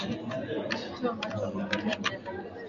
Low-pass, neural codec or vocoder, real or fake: 7.2 kHz; none; real